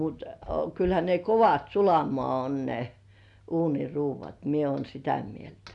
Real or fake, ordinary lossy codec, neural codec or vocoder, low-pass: real; none; none; 10.8 kHz